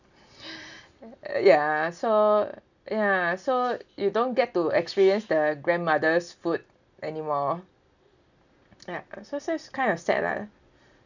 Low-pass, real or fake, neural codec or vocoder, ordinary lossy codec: 7.2 kHz; real; none; none